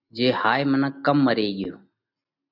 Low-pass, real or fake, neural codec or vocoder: 5.4 kHz; real; none